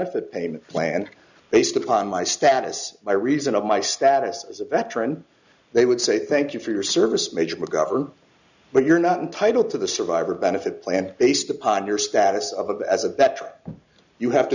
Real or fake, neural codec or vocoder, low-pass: real; none; 7.2 kHz